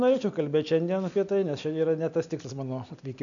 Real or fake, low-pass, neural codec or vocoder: real; 7.2 kHz; none